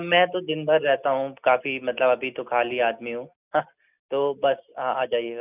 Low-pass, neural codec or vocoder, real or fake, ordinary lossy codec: 3.6 kHz; none; real; none